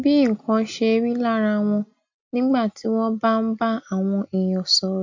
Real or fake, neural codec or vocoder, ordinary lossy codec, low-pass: real; none; MP3, 48 kbps; 7.2 kHz